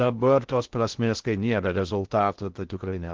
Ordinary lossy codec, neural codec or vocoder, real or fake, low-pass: Opus, 16 kbps; codec, 16 kHz in and 24 kHz out, 0.6 kbps, FocalCodec, streaming, 2048 codes; fake; 7.2 kHz